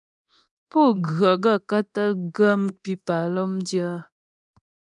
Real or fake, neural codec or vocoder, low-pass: fake; codec, 24 kHz, 0.9 kbps, DualCodec; 10.8 kHz